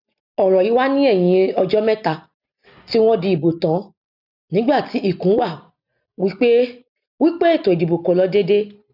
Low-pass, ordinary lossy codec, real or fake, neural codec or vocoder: 5.4 kHz; none; real; none